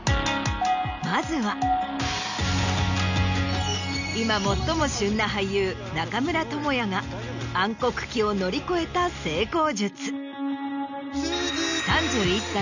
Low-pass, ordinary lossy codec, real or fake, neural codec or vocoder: 7.2 kHz; none; real; none